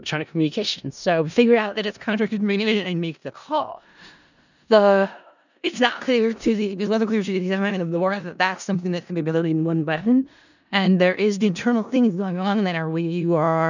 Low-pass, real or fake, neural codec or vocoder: 7.2 kHz; fake; codec, 16 kHz in and 24 kHz out, 0.4 kbps, LongCat-Audio-Codec, four codebook decoder